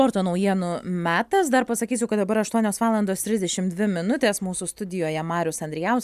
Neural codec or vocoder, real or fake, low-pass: none; real; 14.4 kHz